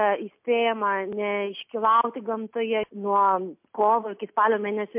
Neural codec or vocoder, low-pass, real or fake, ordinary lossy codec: none; 3.6 kHz; real; MP3, 32 kbps